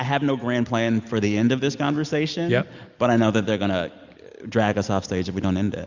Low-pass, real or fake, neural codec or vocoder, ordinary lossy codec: 7.2 kHz; real; none; Opus, 64 kbps